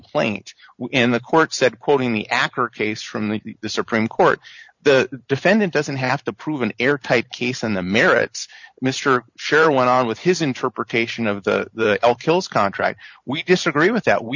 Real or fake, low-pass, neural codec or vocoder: fake; 7.2 kHz; vocoder, 44.1 kHz, 128 mel bands every 512 samples, BigVGAN v2